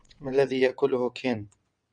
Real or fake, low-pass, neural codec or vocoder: fake; 9.9 kHz; vocoder, 22.05 kHz, 80 mel bands, WaveNeXt